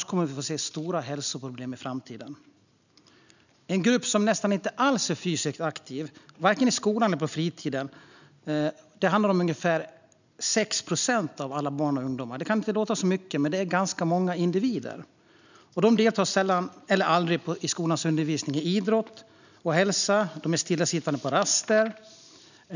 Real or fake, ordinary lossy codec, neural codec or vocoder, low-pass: real; none; none; 7.2 kHz